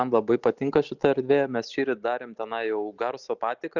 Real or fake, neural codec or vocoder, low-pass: real; none; 7.2 kHz